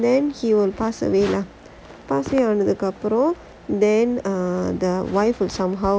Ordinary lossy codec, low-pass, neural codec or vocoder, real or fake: none; none; none; real